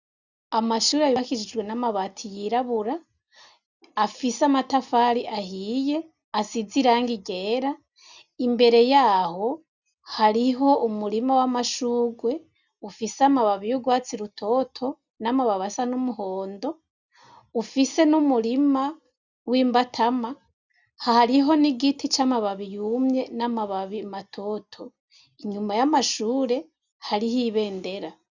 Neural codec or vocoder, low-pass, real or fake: none; 7.2 kHz; real